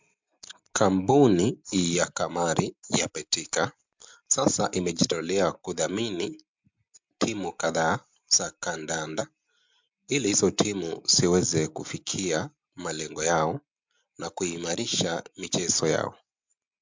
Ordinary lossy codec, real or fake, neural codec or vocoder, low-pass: MP3, 64 kbps; real; none; 7.2 kHz